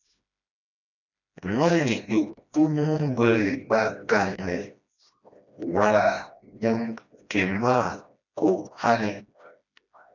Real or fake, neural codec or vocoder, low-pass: fake; codec, 16 kHz, 1 kbps, FreqCodec, smaller model; 7.2 kHz